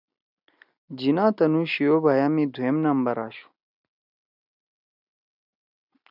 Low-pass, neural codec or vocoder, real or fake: 5.4 kHz; none; real